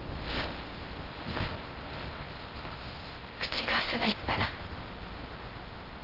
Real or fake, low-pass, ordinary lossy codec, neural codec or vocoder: fake; 5.4 kHz; Opus, 16 kbps; codec, 16 kHz in and 24 kHz out, 0.6 kbps, FocalCodec, streaming, 4096 codes